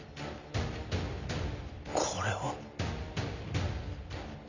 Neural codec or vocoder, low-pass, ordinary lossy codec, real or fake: none; 7.2 kHz; Opus, 64 kbps; real